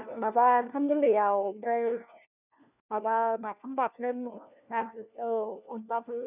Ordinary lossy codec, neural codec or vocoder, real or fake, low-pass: none; codec, 16 kHz, 1 kbps, FunCodec, trained on LibriTTS, 50 frames a second; fake; 3.6 kHz